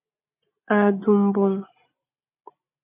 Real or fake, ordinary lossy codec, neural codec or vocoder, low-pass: real; MP3, 32 kbps; none; 3.6 kHz